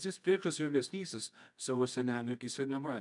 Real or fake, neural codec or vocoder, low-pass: fake; codec, 24 kHz, 0.9 kbps, WavTokenizer, medium music audio release; 10.8 kHz